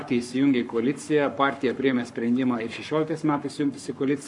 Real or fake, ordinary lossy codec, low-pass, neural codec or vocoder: fake; MP3, 64 kbps; 10.8 kHz; codec, 44.1 kHz, 7.8 kbps, Pupu-Codec